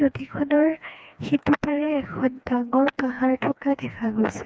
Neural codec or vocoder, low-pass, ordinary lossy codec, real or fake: codec, 16 kHz, 2 kbps, FreqCodec, smaller model; none; none; fake